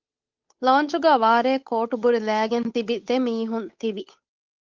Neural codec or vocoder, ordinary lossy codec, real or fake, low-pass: codec, 16 kHz, 8 kbps, FunCodec, trained on Chinese and English, 25 frames a second; Opus, 32 kbps; fake; 7.2 kHz